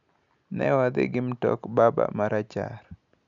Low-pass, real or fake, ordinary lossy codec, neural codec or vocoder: 7.2 kHz; real; none; none